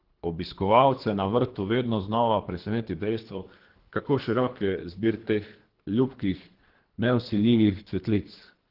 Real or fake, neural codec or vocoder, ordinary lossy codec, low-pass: fake; codec, 24 kHz, 3 kbps, HILCodec; Opus, 16 kbps; 5.4 kHz